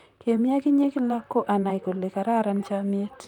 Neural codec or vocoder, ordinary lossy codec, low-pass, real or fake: vocoder, 44.1 kHz, 128 mel bands, Pupu-Vocoder; none; 19.8 kHz; fake